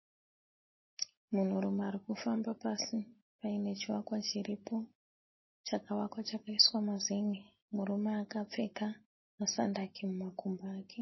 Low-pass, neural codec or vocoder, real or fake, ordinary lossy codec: 7.2 kHz; none; real; MP3, 24 kbps